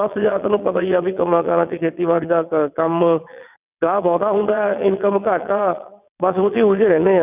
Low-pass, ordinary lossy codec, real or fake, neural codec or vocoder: 3.6 kHz; none; fake; vocoder, 22.05 kHz, 80 mel bands, WaveNeXt